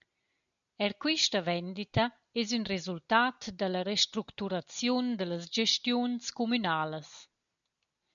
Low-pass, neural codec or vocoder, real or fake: 7.2 kHz; none; real